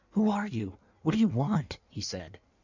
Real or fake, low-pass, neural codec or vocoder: fake; 7.2 kHz; codec, 16 kHz in and 24 kHz out, 1.1 kbps, FireRedTTS-2 codec